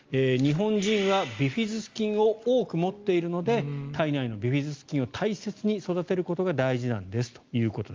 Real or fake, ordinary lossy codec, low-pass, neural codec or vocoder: real; Opus, 32 kbps; 7.2 kHz; none